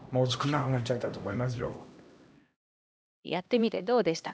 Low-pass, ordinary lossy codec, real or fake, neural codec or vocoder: none; none; fake; codec, 16 kHz, 1 kbps, X-Codec, HuBERT features, trained on LibriSpeech